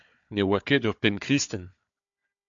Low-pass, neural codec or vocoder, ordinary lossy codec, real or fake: 7.2 kHz; codec, 16 kHz, 4 kbps, FunCodec, trained on Chinese and English, 50 frames a second; AAC, 64 kbps; fake